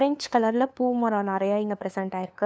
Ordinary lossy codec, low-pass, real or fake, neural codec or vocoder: none; none; fake; codec, 16 kHz, 2 kbps, FunCodec, trained on LibriTTS, 25 frames a second